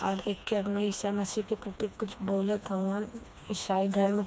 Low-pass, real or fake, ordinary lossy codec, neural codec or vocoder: none; fake; none; codec, 16 kHz, 2 kbps, FreqCodec, smaller model